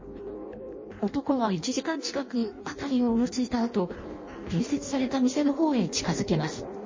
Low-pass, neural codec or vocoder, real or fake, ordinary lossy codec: 7.2 kHz; codec, 16 kHz in and 24 kHz out, 0.6 kbps, FireRedTTS-2 codec; fake; MP3, 32 kbps